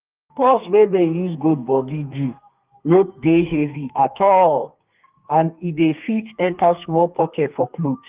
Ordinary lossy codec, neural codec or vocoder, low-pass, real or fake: Opus, 32 kbps; codec, 32 kHz, 1.9 kbps, SNAC; 3.6 kHz; fake